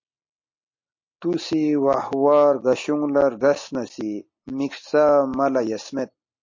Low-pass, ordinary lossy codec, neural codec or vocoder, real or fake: 7.2 kHz; MP3, 32 kbps; none; real